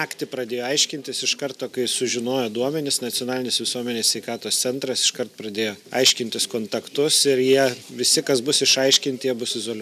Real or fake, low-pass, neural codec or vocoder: real; 14.4 kHz; none